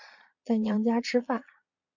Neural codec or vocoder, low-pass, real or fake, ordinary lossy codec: vocoder, 22.05 kHz, 80 mel bands, Vocos; 7.2 kHz; fake; AAC, 48 kbps